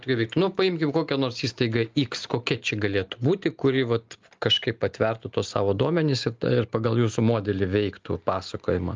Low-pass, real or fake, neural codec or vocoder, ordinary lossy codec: 7.2 kHz; real; none; Opus, 24 kbps